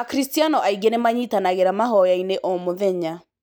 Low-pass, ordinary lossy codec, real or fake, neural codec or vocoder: none; none; real; none